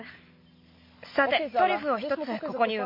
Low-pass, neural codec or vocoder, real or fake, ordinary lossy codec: 5.4 kHz; none; real; MP3, 32 kbps